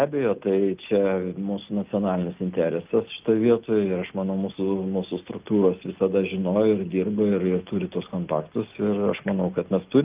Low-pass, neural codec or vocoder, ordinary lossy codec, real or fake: 3.6 kHz; none; Opus, 24 kbps; real